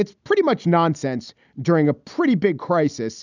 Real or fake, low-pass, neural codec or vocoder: real; 7.2 kHz; none